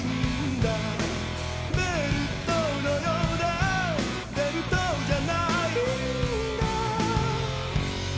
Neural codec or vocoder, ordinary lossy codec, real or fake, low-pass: none; none; real; none